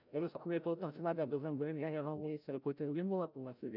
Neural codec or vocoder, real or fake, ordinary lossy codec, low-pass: codec, 16 kHz, 0.5 kbps, FreqCodec, larger model; fake; none; 5.4 kHz